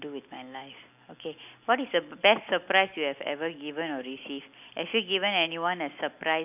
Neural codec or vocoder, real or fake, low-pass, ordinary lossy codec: none; real; 3.6 kHz; none